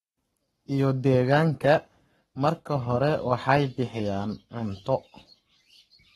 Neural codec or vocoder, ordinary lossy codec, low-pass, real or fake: codec, 44.1 kHz, 7.8 kbps, Pupu-Codec; AAC, 32 kbps; 19.8 kHz; fake